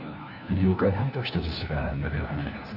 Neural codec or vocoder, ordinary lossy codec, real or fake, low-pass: codec, 16 kHz, 1 kbps, FunCodec, trained on LibriTTS, 50 frames a second; none; fake; 5.4 kHz